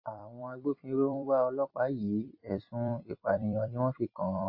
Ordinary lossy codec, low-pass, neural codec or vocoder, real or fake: AAC, 48 kbps; 5.4 kHz; vocoder, 44.1 kHz, 128 mel bands every 256 samples, BigVGAN v2; fake